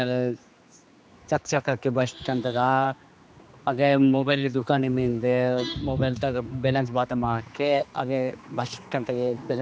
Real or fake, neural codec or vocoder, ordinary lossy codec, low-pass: fake; codec, 16 kHz, 2 kbps, X-Codec, HuBERT features, trained on general audio; none; none